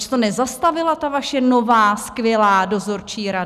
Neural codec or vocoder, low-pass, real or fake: none; 14.4 kHz; real